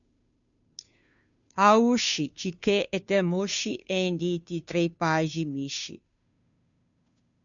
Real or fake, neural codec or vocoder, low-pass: fake; codec, 16 kHz, 2 kbps, FunCodec, trained on Chinese and English, 25 frames a second; 7.2 kHz